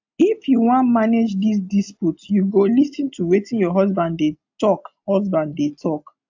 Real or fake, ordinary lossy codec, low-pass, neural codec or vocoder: real; AAC, 48 kbps; 7.2 kHz; none